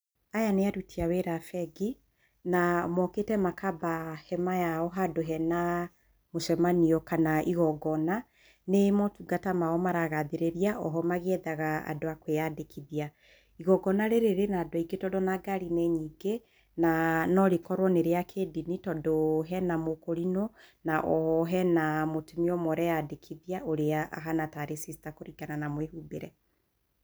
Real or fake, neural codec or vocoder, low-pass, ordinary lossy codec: real; none; none; none